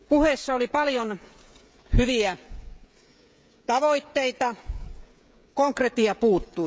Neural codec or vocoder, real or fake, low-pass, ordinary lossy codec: codec, 16 kHz, 16 kbps, FreqCodec, smaller model; fake; none; none